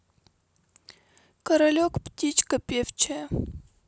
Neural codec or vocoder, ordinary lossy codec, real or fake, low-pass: none; none; real; none